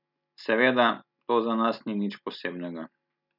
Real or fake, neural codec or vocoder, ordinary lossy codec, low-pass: real; none; none; 5.4 kHz